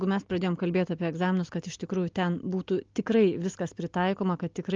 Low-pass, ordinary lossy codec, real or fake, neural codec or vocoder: 7.2 kHz; Opus, 32 kbps; real; none